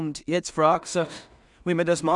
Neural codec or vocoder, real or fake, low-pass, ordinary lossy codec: codec, 16 kHz in and 24 kHz out, 0.4 kbps, LongCat-Audio-Codec, two codebook decoder; fake; 10.8 kHz; none